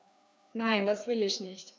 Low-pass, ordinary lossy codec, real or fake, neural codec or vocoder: none; none; fake; codec, 16 kHz, 2 kbps, FreqCodec, larger model